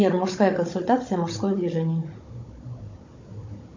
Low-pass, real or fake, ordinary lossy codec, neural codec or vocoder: 7.2 kHz; fake; MP3, 48 kbps; codec, 16 kHz, 16 kbps, FunCodec, trained on Chinese and English, 50 frames a second